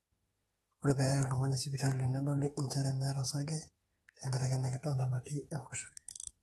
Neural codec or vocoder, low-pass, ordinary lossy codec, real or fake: autoencoder, 48 kHz, 32 numbers a frame, DAC-VAE, trained on Japanese speech; 19.8 kHz; AAC, 32 kbps; fake